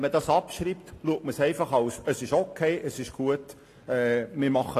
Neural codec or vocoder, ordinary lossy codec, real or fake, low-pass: none; AAC, 48 kbps; real; 14.4 kHz